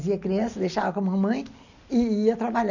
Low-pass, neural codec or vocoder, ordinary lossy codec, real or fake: 7.2 kHz; none; none; real